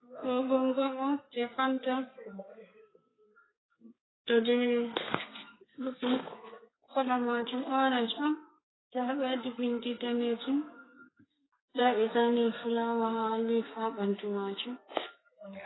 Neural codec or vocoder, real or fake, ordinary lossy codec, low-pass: codec, 32 kHz, 1.9 kbps, SNAC; fake; AAC, 16 kbps; 7.2 kHz